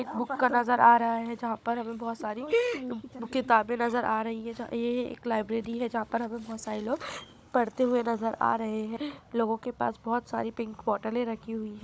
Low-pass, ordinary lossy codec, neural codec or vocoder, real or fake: none; none; codec, 16 kHz, 16 kbps, FunCodec, trained on Chinese and English, 50 frames a second; fake